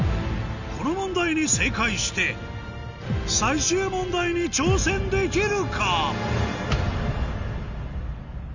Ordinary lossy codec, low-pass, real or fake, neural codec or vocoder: none; 7.2 kHz; real; none